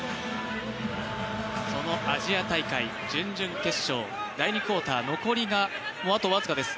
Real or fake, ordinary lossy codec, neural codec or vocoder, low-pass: real; none; none; none